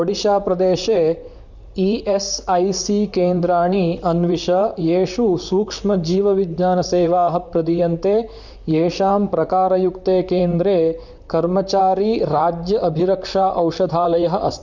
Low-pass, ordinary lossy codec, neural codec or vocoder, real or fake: 7.2 kHz; none; vocoder, 44.1 kHz, 128 mel bands, Pupu-Vocoder; fake